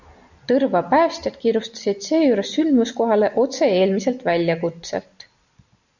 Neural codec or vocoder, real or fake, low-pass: none; real; 7.2 kHz